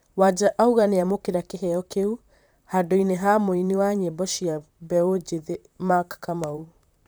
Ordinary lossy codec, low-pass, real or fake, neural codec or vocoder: none; none; fake; vocoder, 44.1 kHz, 128 mel bands, Pupu-Vocoder